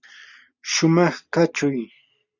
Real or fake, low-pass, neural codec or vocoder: real; 7.2 kHz; none